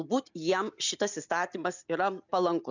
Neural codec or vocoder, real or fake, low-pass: none; real; 7.2 kHz